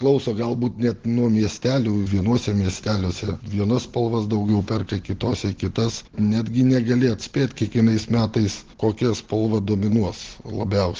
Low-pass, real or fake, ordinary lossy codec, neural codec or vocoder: 7.2 kHz; real; Opus, 16 kbps; none